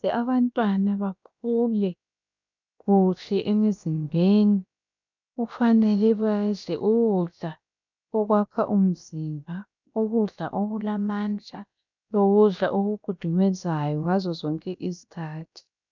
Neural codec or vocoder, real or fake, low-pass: codec, 16 kHz, about 1 kbps, DyCAST, with the encoder's durations; fake; 7.2 kHz